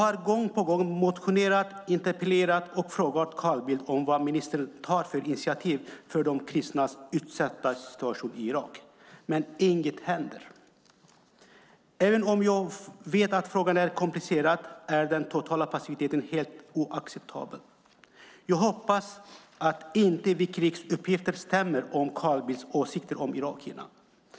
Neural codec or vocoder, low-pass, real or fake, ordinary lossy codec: none; none; real; none